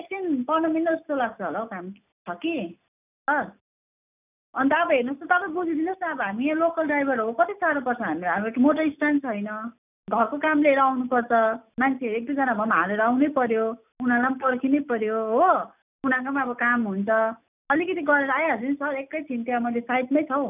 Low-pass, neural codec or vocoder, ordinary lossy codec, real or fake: 3.6 kHz; none; none; real